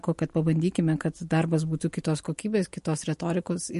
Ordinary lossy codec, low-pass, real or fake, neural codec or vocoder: MP3, 48 kbps; 14.4 kHz; real; none